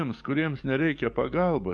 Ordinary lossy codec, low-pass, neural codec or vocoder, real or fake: MP3, 64 kbps; 9.9 kHz; codec, 44.1 kHz, 7.8 kbps, DAC; fake